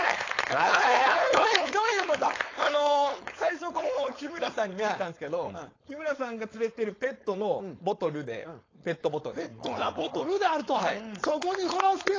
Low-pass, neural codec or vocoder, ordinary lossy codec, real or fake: 7.2 kHz; codec, 16 kHz, 4.8 kbps, FACodec; AAC, 32 kbps; fake